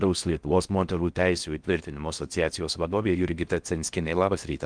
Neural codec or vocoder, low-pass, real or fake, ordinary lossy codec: codec, 16 kHz in and 24 kHz out, 0.6 kbps, FocalCodec, streaming, 4096 codes; 9.9 kHz; fake; Opus, 32 kbps